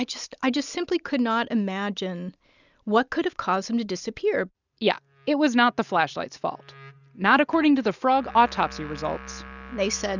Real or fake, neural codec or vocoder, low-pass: real; none; 7.2 kHz